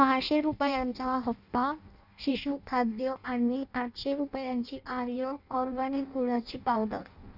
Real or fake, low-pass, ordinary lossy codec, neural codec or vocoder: fake; 5.4 kHz; none; codec, 16 kHz in and 24 kHz out, 0.6 kbps, FireRedTTS-2 codec